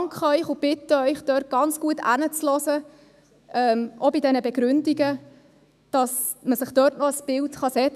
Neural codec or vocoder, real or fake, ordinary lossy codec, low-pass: autoencoder, 48 kHz, 128 numbers a frame, DAC-VAE, trained on Japanese speech; fake; none; 14.4 kHz